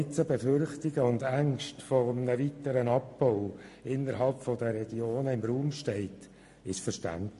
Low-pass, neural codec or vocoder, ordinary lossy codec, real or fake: 14.4 kHz; none; MP3, 48 kbps; real